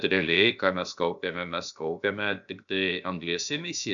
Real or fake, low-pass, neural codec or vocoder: fake; 7.2 kHz; codec, 16 kHz, about 1 kbps, DyCAST, with the encoder's durations